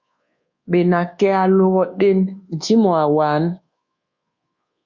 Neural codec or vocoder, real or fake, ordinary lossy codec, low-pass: codec, 24 kHz, 1.2 kbps, DualCodec; fake; Opus, 64 kbps; 7.2 kHz